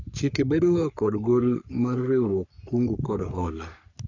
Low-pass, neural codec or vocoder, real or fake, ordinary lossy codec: 7.2 kHz; codec, 44.1 kHz, 3.4 kbps, Pupu-Codec; fake; none